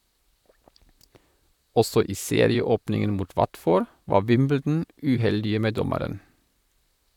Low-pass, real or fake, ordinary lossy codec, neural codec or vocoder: 19.8 kHz; fake; none; vocoder, 44.1 kHz, 128 mel bands, Pupu-Vocoder